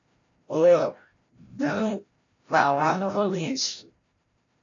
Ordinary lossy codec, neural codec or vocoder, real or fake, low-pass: AAC, 32 kbps; codec, 16 kHz, 0.5 kbps, FreqCodec, larger model; fake; 7.2 kHz